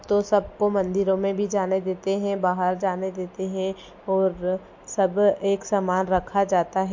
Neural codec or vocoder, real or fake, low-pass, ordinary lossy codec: none; real; 7.2 kHz; MP3, 48 kbps